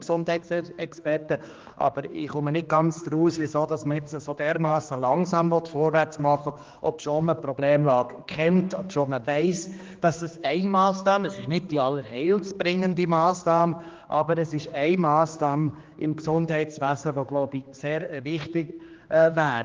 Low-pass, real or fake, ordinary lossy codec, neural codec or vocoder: 7.2 kHz; fake; Opus, 32 kbps; codec, 16 kHz, 2 kbps, X-Codec, HuBERT features, trained on general audio